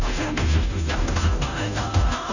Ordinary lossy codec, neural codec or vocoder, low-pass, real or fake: none; codec, 16 kHz, 0.5 kbps, FunCodec, trained on Chinese and English, 25 frames a second; 7.2 kHz; fake